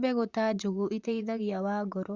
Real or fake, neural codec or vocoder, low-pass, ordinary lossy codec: fake; vocoder, 44.1 kHz, 128 mel bands, Pupu-Vocoder; 7.2 kHz; Opus, 64 kbps